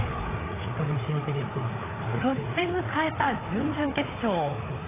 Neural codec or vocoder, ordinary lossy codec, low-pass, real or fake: codec, 16 kHz, 4 kbps, FreqCodec, larger model; AAC, 16 kbps; 3.6 kHz; fake